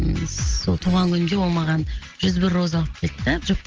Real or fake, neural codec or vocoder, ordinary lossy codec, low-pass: real; none; Opus, 16 kbps; 7.2 kHz